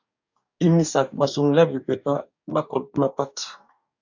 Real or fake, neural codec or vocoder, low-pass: fake; codec, 44.1 kHz, 2.6 kbps, DAC; 7.2 kHz